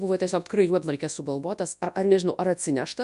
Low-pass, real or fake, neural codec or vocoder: 10.8 kHz; fake; codec, 24 kHz, 0.9 kbps, WavTokenizer, large speech release